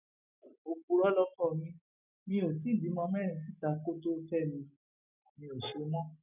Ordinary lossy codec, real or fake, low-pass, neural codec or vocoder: none; real; 3.6 kHz; none